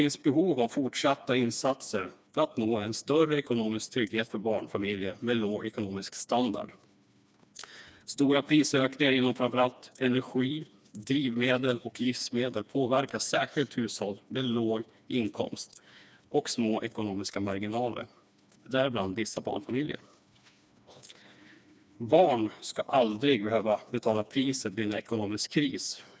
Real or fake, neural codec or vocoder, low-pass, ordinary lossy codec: fake; codec, 16 kHz, 2 kbps, FreqCodec, smaller model; none; none